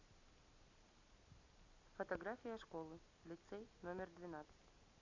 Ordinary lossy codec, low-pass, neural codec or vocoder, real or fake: Opus, 64 kbps; 7.2 kHz; none; real